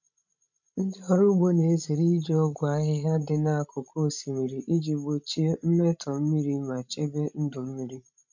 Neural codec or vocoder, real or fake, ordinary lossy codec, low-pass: codec, 16 kHz, 16 kbps, FreqCodec, larger model; fake; none; 7.2 kHz